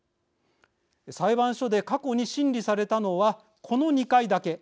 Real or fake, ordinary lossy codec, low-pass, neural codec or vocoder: real; none; none; none